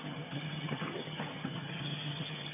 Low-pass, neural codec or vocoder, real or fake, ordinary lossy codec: 3.6 kHz; vocoder, 22.05 kHz, 80 mel bands, HiFi-GAN; fake; none